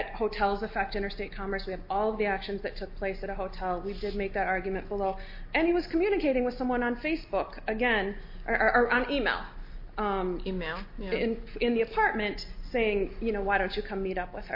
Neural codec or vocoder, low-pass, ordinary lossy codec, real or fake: none; 5.4 kHz; MP3, 32 kbps; real